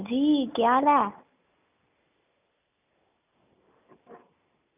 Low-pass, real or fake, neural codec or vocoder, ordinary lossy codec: 3.6 kHz; real; none; none